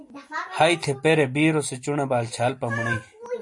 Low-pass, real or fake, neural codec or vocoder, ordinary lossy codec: 10.8 kHz; real; none; MP3, 48 kbps